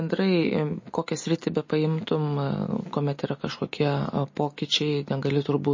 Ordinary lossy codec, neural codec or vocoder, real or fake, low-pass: MP3, 32 kbps; none; real; 7.2 kHz